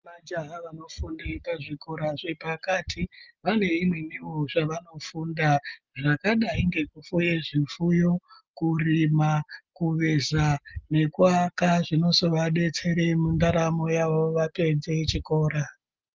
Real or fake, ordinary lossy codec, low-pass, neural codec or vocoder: real; Opus, 32 kbps; 7.2 kHz; none